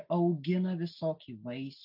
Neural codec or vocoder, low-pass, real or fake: none; 5.4 kHz; real